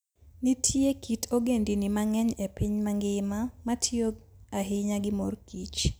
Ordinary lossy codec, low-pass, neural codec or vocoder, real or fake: none; none; none; real